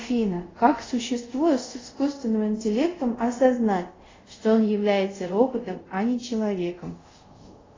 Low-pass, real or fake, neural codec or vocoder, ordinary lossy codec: 7.2 kHz; fake; codec, 24 kHz, 0.5 kbps, DualCodec; AAC, 32 kbps